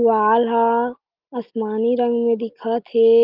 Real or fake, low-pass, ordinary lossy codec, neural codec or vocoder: real; 5.4 kHz; Opus, 32 kbps; none